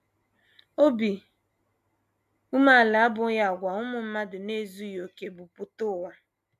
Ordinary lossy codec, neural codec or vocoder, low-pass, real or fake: MP3, 96 kbps; none; 14.4 kHz; real